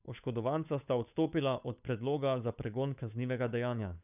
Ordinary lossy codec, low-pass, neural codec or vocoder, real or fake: none; 3.6 kHz; codec, 16 kHz, 8 kbps, FunCodec, trained on Chinese and English, 25 frames a second; fake